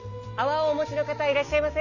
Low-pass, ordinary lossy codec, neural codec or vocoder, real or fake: 7.2 kHz; none; none; real